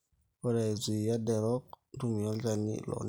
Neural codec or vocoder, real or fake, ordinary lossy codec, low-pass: none; real; none; none